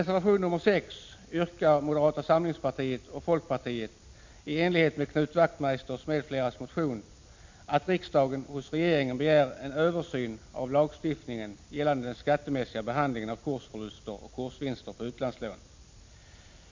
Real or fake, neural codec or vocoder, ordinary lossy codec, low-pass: real; none; MP3, 48 kbps; 7.2 kHz